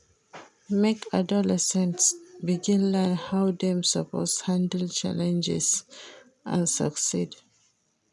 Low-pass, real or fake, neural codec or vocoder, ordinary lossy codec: none; real; none; none